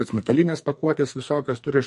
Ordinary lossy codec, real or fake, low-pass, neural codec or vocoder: MP3, 48 kbps; fake; 14.4 kHz; codec, 44.1 kHz, 2.6 kbps, SNAC